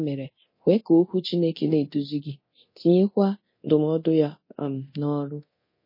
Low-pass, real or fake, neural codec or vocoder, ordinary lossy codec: 5.4 kHz; fake; codec, 24 kHz, 0.9 kbps, DualCodec; MP3, 24 kbps